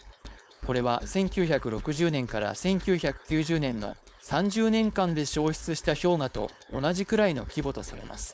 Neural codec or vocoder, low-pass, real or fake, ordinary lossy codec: codec, 16 kHz, 4.8 kbps, FACodec; none; fake; none